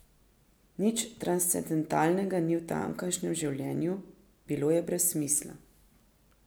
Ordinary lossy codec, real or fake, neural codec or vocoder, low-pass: none; real; none; none